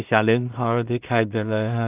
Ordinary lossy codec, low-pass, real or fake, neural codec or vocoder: Opus, 24 kbps; 3.6 kHz; fake; codec, 16 kHz in and 24 kHz out, 0.4 kbps, LongCat-Audio-Codec, two codebook decoder